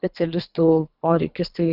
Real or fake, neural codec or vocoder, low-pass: fake; codec, 24 kHz, 3 kbps, HILCodec; 5.4 kHz